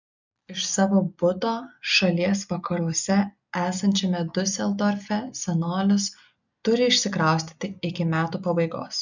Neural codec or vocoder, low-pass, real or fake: none; 7.2 kHz; real